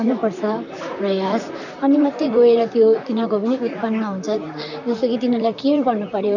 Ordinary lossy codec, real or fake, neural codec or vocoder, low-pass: none; fake; vocoder, 44.1 kHz, 128 mel bands, Pupu-Vocoder; 7.2 kHz